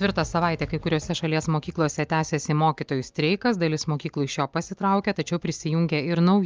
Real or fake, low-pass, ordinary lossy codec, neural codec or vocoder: real; 7.2 kHz; Opus, 32 kbps; none